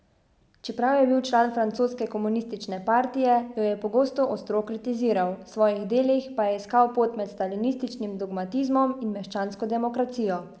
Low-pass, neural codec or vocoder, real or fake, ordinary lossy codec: none; none; real; none